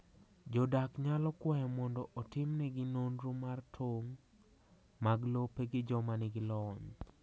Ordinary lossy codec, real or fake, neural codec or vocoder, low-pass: none; real; none; none